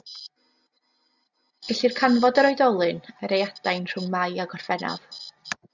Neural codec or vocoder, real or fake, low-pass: none; real; 7.2 kHz